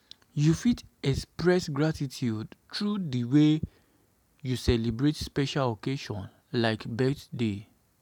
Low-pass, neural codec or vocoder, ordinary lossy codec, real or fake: 19.8 kHz; none; none; real